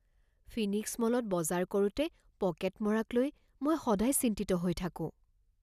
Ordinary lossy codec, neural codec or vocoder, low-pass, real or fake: none; none; 14.4 kHz; real